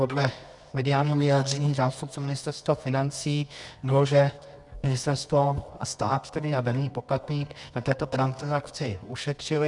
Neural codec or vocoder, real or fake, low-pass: codec, 24 kHz, 0.9 kbps, WavTokenizer, medium music audio release; fake; 10.8 kHz